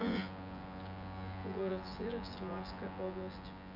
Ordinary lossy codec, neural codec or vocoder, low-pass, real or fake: none; vocoder, 24 kHz, 100 mel bands, Vocos; 5.4 kHz; fake